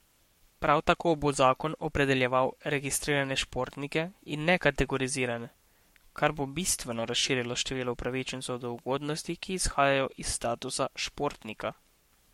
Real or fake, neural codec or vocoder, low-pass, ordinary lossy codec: fake; codec, 44.1 kHz, 7.8 kbps, Pupu-Codec; 19.8 kHz; MP3, 64 kbps